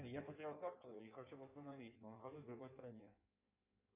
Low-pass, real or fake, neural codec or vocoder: 3.6 kHz; fake; codec, 16 kHz in and 24 kHz out, 1.1 kbps, FireRedTTS-2 codec